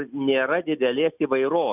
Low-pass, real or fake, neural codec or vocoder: 3.6 kHz; real; none